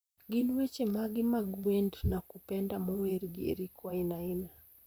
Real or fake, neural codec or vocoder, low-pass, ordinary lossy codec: fake; vocoder, 44.1 kHz, 128 mel bands, Pupu-Vocoder; none; none